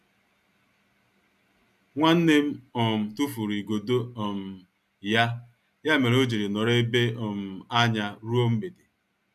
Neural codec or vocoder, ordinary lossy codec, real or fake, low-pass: none; none; real; 14.4 kHz